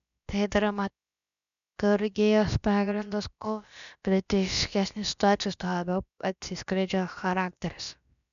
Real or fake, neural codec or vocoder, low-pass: fake; codec, 16 kHz, about 1 kbps, DyCAST, with the encoder's durations; 7.2 kHz